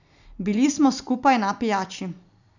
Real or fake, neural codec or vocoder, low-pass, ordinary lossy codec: real; none; 7.2 kHz; none